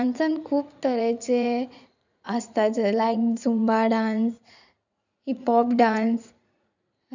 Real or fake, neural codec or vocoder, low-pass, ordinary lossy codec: fake; vocoder, 44.1 kHz, 80 mel bands, Vocos; 7.2 kHz; none